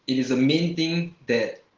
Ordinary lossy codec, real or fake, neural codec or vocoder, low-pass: Opus, 16 kbps; real; none; 7.2 kHz